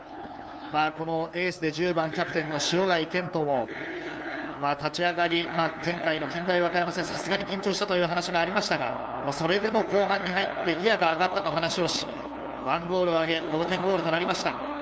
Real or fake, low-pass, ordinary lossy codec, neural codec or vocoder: fake; none; none; codec, 16 kHz, 2 kbps, FunCodec, trained on LibriTTS, 25 frames a second